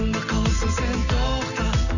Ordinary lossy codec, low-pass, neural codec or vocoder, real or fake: none; 7.2 kHz; none; real